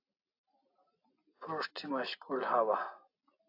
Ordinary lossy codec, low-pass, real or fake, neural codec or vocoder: AAC, 32 kbps; 5.4 kHz; real; none